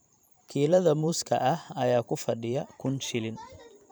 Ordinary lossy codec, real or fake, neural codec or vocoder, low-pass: none; fake; vocoder, 44.1 kHz, 128 mel bands every 512 samples, BigVGAN v2; none